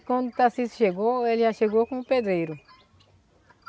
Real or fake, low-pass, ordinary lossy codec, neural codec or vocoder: real; none; none; none